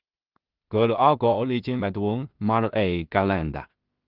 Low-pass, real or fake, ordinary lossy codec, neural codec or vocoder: 5.4 kHz; fake; Opus, 32 kbps; codec, 16 kHz in and 24 kHz out, 0.4 kbps, LongCat-Audio-Codec, two codebook decoder